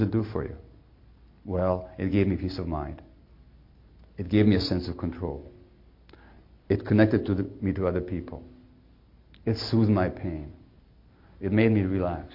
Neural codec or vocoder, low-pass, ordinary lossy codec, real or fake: none; 5.4 kHz; MP3, 32 kbps; real